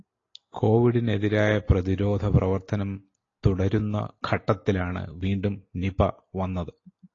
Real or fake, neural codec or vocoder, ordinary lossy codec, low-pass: real; none; AAC, 32 kbps; 7.2 kHz